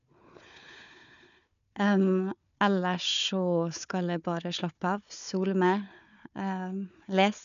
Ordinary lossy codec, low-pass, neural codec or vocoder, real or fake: none; 7.2 kHz; codec, 16 kHz, 4 kbps, FunCodec, trained on Chinese and English, 50 frames a second; fake